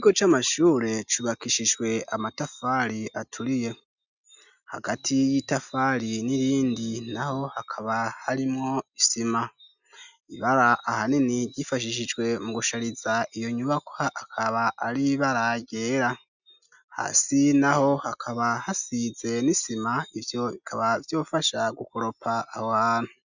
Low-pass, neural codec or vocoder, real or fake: 7.2 kHz; none; real